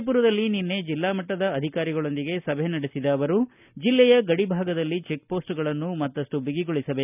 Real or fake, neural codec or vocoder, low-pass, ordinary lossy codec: real; none; 3.6 kHz; none